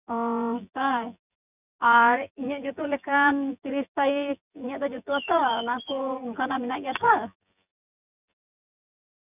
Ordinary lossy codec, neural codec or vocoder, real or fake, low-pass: none; vocoder, 24 kHz, 100 mel bands, Vocos; fake; 3.6 kHz